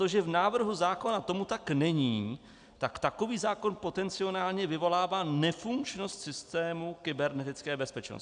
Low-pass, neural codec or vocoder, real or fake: 9.9 kHz; none; real